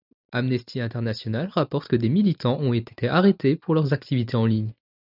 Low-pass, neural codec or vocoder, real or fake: 5.4 kHz; none; real